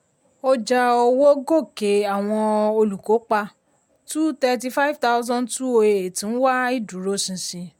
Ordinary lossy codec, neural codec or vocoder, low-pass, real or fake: MP3, 96 kbps; none; 19.8 kHz; real